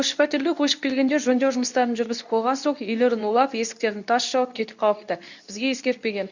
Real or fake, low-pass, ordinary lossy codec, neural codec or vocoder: fake; 7.2 kHz; none; codec, 24 kHz, 0.9 kbps, WavTokenizer, medium speech release version 2